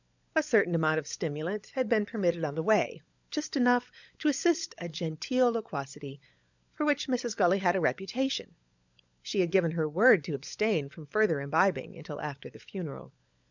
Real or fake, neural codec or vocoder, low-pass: fake; codec, 16 kHz, 16 kbps, FunCodec, trained on LibriTTS, 50 frames a second; 7.2 kHz